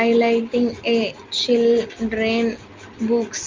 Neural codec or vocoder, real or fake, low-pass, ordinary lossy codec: none; real; 7.2 kHz; Opus, 24 kbps